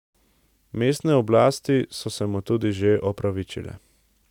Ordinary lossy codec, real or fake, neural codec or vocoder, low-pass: none; real; none; 19.8 kHz